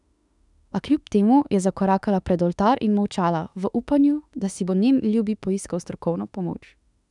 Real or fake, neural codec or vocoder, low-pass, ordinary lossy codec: fake; autoencoder, 48 kHz, 32 numbers a frame, DAC-VAE, trained on Japanese speech; 10.8 kHz; none